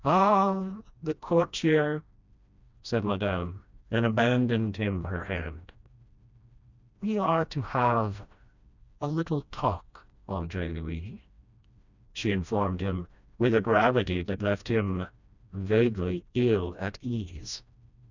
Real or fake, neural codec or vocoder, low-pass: fake; codec, 16 kHz, 1 kbps, FreqCodec, smaller model; 7.2 kHz